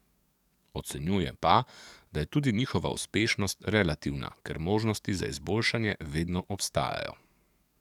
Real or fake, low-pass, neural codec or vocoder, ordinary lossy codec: fake; 19.8 kHz; codec, 44.1 kHz, 7.8 kbps, DAC; none